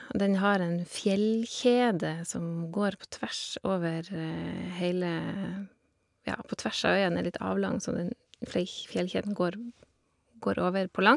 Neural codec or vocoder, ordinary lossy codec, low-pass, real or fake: none; MP3, 96 kbps; 10.8 kHz; real